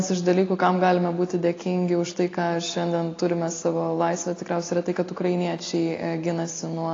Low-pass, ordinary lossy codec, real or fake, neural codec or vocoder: 7.2 kHz; AAC, 32 kbps; real; none